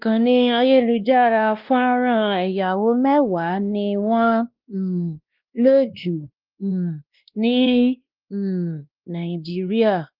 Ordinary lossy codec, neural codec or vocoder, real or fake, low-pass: Opus, 32 kbps; codec, 16 kHz, 1 kbps, X-Codec, WavLM features, trained on Multilingual LibriSpeech; fake; 5.4 kHz